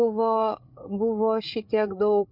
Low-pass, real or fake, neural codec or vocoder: 5.4 kHz; fake; codec, 16 kHz, 8 kbps, FreqCodec, larger model